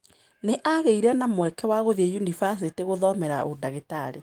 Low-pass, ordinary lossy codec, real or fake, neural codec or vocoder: 19.8 kHz; Opus, 24 kbps; fake; autoencoder, 48 kHz, 128 numbers a frame, DAC-VAE, trained on Japanese speech